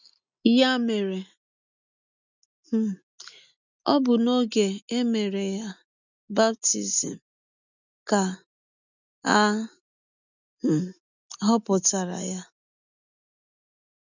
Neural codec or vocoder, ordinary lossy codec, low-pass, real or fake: none; none; 7.2 kHz; real